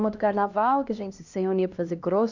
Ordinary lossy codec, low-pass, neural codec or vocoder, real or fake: none; 7.2 kHz; codec, 16 kHz, 1 kbps, X-Codec, HuBERT features, trained on LibriSpeech; fake